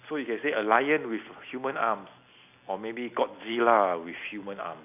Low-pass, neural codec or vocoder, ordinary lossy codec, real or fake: 3.6 kHz; none; none; real